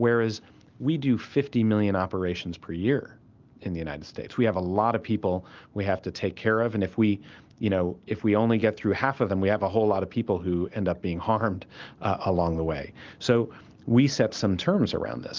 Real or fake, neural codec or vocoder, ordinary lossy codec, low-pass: real; none; Opus, 32 kbps; 7.2 kHz